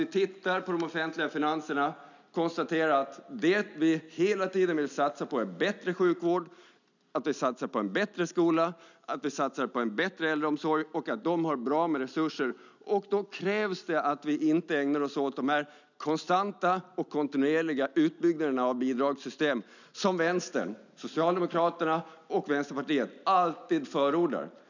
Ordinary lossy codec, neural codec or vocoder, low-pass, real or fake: none; none; 7.2 kHz; real